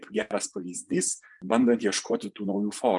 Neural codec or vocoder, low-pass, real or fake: vocoder, 24 kHz, 100 mel bands, Vocos; 10.8 kHz; fake